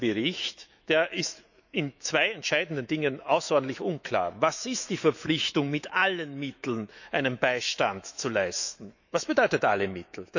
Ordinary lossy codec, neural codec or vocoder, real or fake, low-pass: none; autoencoder, 48 kHz, 128 numbers a frame, DAC-VAE, trained on Japanese speech; fake; 7.2 kHz